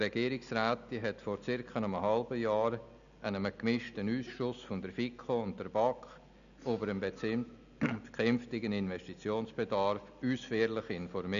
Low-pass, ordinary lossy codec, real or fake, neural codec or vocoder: 7.2 kHz; none; real; none